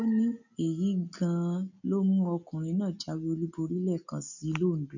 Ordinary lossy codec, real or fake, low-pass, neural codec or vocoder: none; real; 7.2 kHz; none